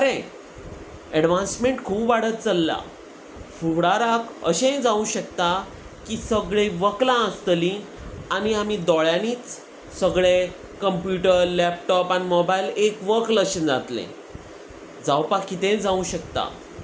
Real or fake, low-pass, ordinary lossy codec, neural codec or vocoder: real; none; none; none